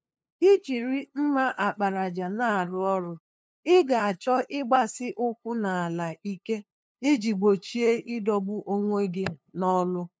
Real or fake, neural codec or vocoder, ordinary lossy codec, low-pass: fake; codec, 16 kHz, 2 kbps, FunCodec, trained on LibriTTS, 25 frames a second; none; none